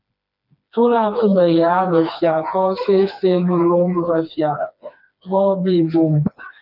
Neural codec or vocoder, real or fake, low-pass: codec, 16 kHz, 2 kbps, FreqCodec, smaller model; fake; 5.4 kHz